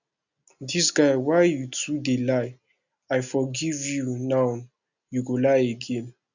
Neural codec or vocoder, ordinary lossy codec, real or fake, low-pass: none; none; real; 7.2 kHz